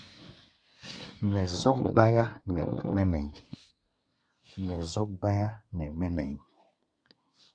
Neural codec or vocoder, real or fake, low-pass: codec, 24 kHz, 1 kbps, SNAC; fake; 9.9 kHz